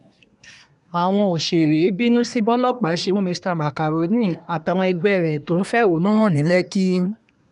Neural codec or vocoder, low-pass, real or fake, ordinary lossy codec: codec, 24 kHz, 1 kbps, SNAC; 10.8 kHz; fake; none